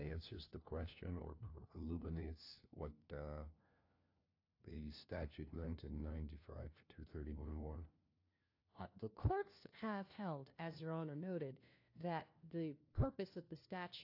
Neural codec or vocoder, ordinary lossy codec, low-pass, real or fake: codec, 16 kHz, 1 kbps, FunCodec, trained on LibriTTS, 50 frames a second; AAC, 32 kbps; 5.4 kHz; fake